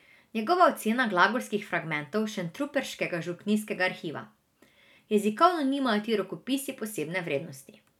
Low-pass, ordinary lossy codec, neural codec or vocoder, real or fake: 19.8 kHz; none; none; real